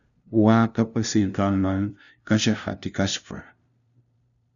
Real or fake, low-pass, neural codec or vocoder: fake; 7.2 kHz; codec, 16 kHz, 0.5 kbps, FunCodec, trained on LibriTTS, 25 frames a second